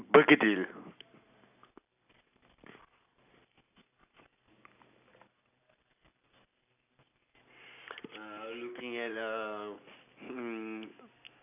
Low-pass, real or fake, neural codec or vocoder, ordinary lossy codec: 3.6 kHz; real; none; none